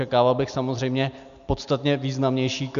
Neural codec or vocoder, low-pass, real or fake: none; 7.2 kHz; real